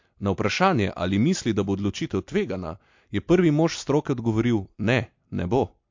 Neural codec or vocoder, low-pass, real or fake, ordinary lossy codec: none; 7.2 kHz; real; MP3, 48 kbps